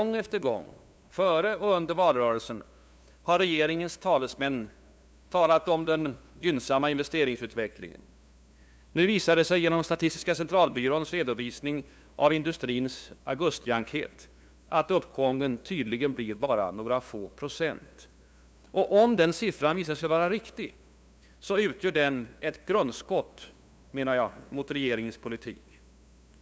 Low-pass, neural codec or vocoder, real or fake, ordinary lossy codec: none; codec, 16 kHz, 2 kbps, FunCodec, trained on LibriTTS, 25 frames a second; fake; none